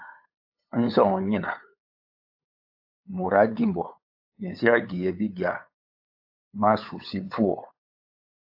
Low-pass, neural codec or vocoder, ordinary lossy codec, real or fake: 5.4 kHz; codec, 16 kHz, 8 kbps, FunCodec, trained on LibriTTS, 25 frames a second; AAC, 48 kbps; fake